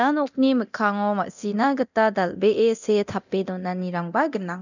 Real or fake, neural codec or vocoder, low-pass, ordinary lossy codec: fake; codec, 24 kHz, 0.9 kbps, DualCodec; 7.2 kHz; none